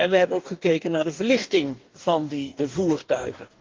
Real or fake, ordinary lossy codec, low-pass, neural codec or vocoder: fake; Opus, 32 kbps; 7.2 kHz; codec, 44.1 kHz, 2.6 kbps, DAC